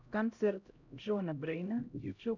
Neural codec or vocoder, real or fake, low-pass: codec, 16 kHz, 0.5 kbps, X-Codec, HuBERT features, trained on LibriSpeech; fake; 7.2 kHz